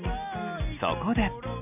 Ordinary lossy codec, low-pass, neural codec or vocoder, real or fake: none; 3.6 kHz; none; real